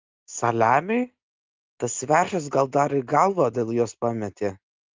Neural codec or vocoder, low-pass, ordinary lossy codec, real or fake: none; 7.2 kHz; Opus, 24 kbps; real